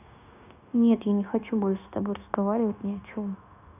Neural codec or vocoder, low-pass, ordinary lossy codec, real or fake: codec, 16 kHz, 0.9 kbps, LongCat-Audio-Codec; 3.6 kHz; none; fake